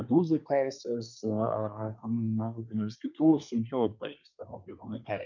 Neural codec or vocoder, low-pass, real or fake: codec, 24 kHz, 1 kbps, SNAC; 7.2 kHz; fake